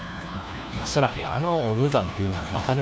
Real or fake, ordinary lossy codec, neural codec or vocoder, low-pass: fake; none; codec, 16 kHz, 1 kbps, FunCodec, trained on LibriTTS, 50 frames a second; none